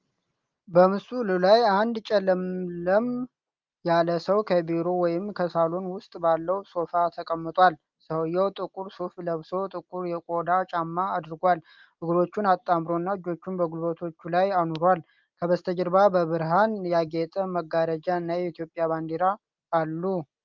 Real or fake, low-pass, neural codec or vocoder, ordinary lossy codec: real; 7.2 kHz; none; Opus, 32 kbps